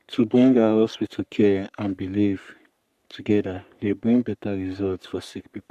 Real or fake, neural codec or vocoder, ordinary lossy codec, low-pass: fake; codec, 44.1 kHz, 3.4 kbps, Pupu-Codec; none; 14.4 kHz